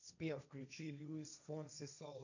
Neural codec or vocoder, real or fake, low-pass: codec, 16 kHz, 1.1 kbps, Voila-Tokenizer; fake; 7.2 kHz